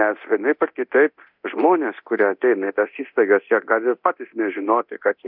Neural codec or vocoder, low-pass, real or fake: codec, 24 kHz, 0.9 kbps, DualCodec; 5.4 kHz; fake